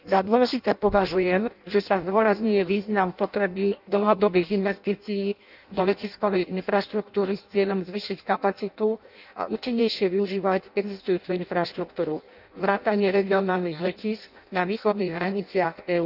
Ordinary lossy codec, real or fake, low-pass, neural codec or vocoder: none; fake; 5.4 kHz; codec, 16 kHz in and 24 kHz out, 0.6 kbps, FireRedTTS-2 codec